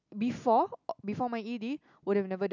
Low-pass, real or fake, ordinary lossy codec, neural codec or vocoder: 7.2 kHz; real; none; none